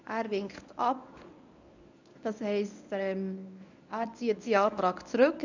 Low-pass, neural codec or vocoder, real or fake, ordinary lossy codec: 7.2 kHz; codec, 24 kHz, 0.9 kbps, WavTokenizer, medium speech release version 1; fake; none